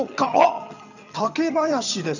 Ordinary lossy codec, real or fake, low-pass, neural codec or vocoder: none; fake; 7.2 kHz; vocoder, 22.05 kHz, 80 mel bands, HiFi-GAN